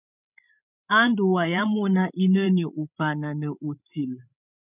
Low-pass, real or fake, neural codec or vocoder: 3.6 kHz; fake; codec, 16 kHz, 16 kbps, FreqCodec, larger model